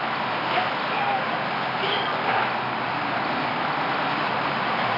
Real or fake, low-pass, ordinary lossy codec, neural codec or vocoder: fake; 5.4 kHz; none; codec, 24 kHz, 0.9 kbps, WavTokenizer, medium music audio release